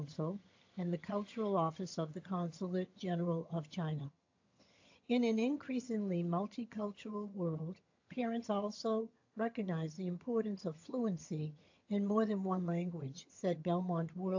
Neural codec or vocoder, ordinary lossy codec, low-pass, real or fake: vocoder, 22.05 kHz, 80 mel bands, HiFi-GAN; AAC, 48 kbps; 7.2 kHz; fake